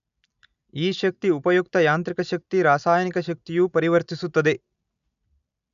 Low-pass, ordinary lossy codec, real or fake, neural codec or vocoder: 7.2 kHz; MP3, 96 kbps; real; none